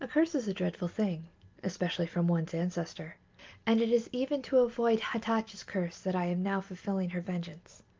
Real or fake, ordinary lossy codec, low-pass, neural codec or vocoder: real; Opus, 32 kbps; 7.2 kHz; none